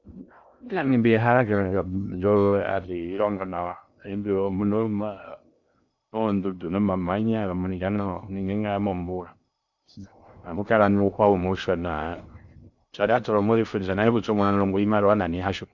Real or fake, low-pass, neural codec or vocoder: fake; 7.2 kHz; codec, 16 kHz in and 24 kHz out, 0.6 kbps, FocalCodec, streaming, 2048 codes